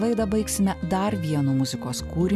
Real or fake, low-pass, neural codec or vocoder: real; 14.4 kHz; none